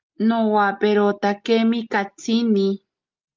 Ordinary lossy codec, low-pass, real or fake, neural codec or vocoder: Opus, 24 kbps; 7.2 kHz; real; none